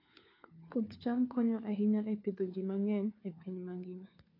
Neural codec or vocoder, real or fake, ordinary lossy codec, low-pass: codec, 16 kHz, 4 kbps, FunCodec, trained on LibriTTS, 50 frames a second; fake; AAC, 32 kbps; 5.4 kHz